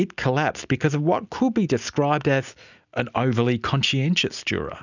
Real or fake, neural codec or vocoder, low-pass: real; none; 7.2 kHz